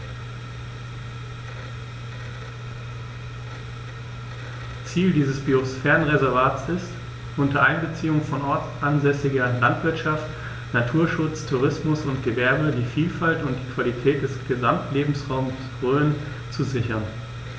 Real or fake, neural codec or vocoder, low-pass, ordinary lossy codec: real; none; none; none